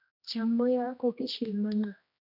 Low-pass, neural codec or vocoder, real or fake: 5.4 kHz; codec, 16 kHz, 1 kbps, X-Codec, HuBERT features, trained on general audio; fake